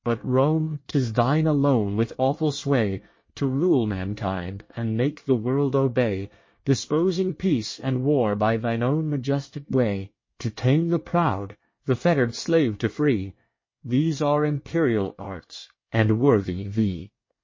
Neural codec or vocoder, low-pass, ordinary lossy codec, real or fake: codec, 24 kHz, 1 kbps, SNAC; 7.2 kHz; MP3, 32 kbps; fake